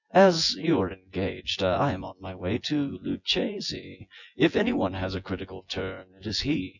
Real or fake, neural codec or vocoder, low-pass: fake; vocoder, 24 kHz, 100 mel bands, Vocos; 7.2 kHz